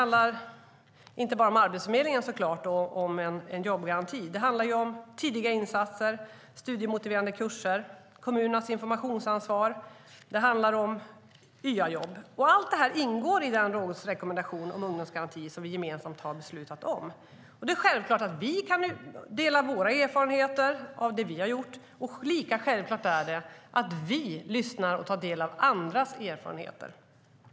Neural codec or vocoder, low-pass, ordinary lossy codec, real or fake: none; none; none; real